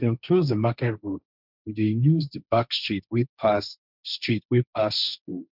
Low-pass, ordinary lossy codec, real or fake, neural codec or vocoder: 5.4 kHz; none; fake; codec, 16 kHz, 1.1 kbps, Voila-Tokenizer